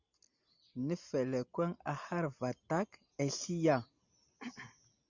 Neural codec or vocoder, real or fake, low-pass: none; real; 7.2 kHz